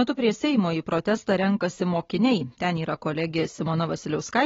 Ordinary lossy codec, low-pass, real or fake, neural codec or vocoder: AAC, 24 kbps; 7.2 kHz; real; none